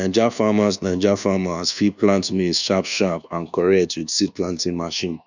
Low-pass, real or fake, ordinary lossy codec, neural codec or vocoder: 7.2 kHz; fake; none; codec, 24 kHz, 1.2 kbps, DualCodec